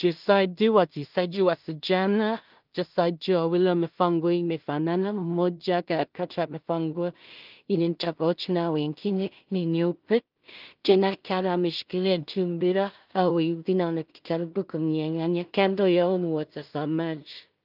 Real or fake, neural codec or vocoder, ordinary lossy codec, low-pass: fake; codec, 16 kHz in and 24 kHz out, 0.4 kbps, LongCat-Audio-Codec, two codebook decoder; Opus, 32 kbps; 5.4 kHz